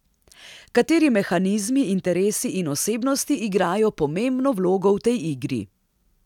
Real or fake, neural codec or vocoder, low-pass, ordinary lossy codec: real; none; 19.8 kHz; none